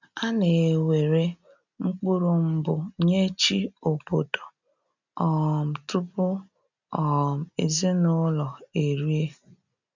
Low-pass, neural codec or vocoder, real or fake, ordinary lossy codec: 7.2 kHz; none; real; none